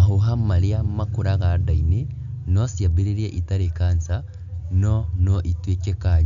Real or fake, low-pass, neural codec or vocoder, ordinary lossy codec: real; 7.2 kHz; none; none